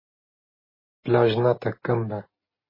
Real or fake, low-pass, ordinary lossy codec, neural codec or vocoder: real; 5.4 kHz; MP3, 24 kbps; none